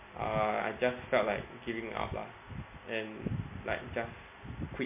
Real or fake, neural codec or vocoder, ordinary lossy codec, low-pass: real; none; MP3, 24 kbps; 3.6 kHz